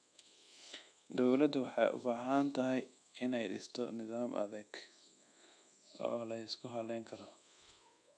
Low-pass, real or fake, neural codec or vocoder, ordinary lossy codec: 9.9 kHz; fake; codec, 24 kHz, 1.2 kbps, DualCodec; none